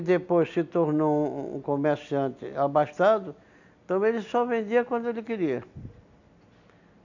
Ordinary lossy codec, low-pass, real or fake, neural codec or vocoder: none; 7.2 kHz; real; none